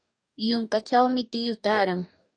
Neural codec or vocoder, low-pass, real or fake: codec, 44.1 kHz, 2.6 kbps, DAC; 9.9 kHz; fake